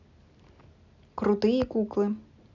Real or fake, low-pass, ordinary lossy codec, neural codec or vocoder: real; 7.2 kHz; none; none